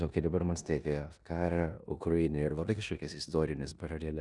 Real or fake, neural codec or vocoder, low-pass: fake; codec, 16 kHz in and 24 kHz out, 0.9 kbps, LongCat-Audio-Codec, four codebook decoder; 10.8 kHz